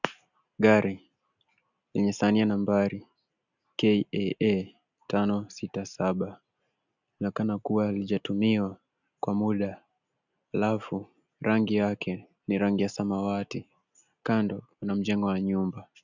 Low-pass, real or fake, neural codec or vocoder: 7.2 kHz; real; none